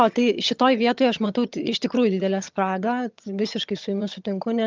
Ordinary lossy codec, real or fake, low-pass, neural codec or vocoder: Opus, 24 kbps; fake; 7.2 kHz; vocoder, 22.05 kHz, 80 mel bands, HiFi-GAN